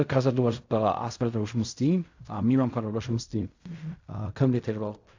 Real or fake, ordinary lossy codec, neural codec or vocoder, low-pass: fake; AAC, 48 kbps; codec, 16 kHz in and 24 kHz out, 0.4 kbps, LongCat-Audio-Codec, fine tuned four codebook decoder; 7.2 kHz